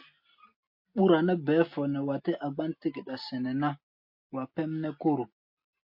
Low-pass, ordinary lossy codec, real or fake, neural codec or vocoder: 5.4 kHz; MP3, 32 kbps; real; none